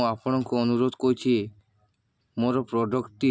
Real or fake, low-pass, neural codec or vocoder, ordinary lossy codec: real; none; none; none